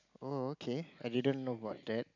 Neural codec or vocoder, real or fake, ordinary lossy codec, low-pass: none; real; none; 7.2 kHz